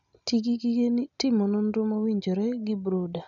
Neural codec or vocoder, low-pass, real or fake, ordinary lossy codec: none; 7.2 kHz; real; none